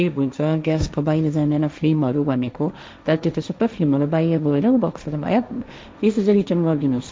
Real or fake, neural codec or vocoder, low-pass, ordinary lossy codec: fake; codec, 16 kHz, 1.1 kbps, Voila-Tokenizer; none; none